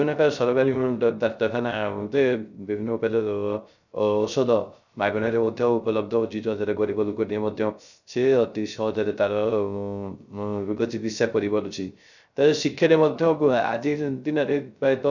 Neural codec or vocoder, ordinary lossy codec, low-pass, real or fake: codec, 16 kHz, 0.3 kbps, FocalCodec; none; 7.2 kHz; fake